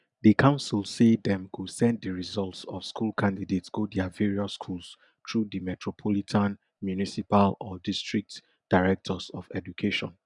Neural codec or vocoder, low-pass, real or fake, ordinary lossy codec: none; 10.8 kHz; real; none